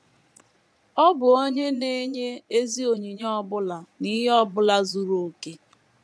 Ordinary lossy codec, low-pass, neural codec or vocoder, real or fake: none; none; vocoder, 22.05 kHz, 80 mel bands, Vocos; fake